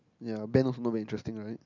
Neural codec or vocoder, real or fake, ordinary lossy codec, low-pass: none; real; none; 7.2 kHz